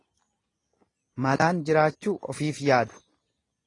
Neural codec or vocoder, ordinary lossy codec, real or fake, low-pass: none; AAC, 32 kbps; real; 10.8 kHz